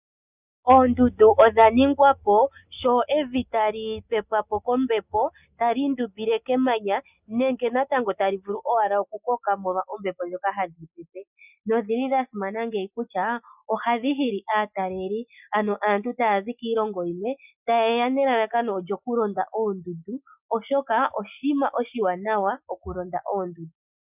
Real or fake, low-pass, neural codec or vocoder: real; 3.6 kHz; none